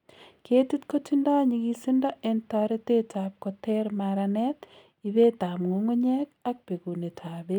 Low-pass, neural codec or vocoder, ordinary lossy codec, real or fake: 19.8 kHz; none; none; real